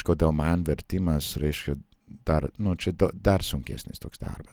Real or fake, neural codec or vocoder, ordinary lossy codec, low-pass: real; none; Opus, 24 kbps; 19.8 kHz